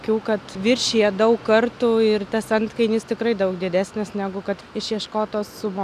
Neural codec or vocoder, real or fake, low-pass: none; real; 14.4 kHz